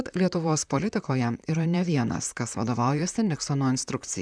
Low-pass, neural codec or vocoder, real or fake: 9.9 kHz; vocoder, 44.1 kHz, 128 mel bands, Pupu-Vocoder; fake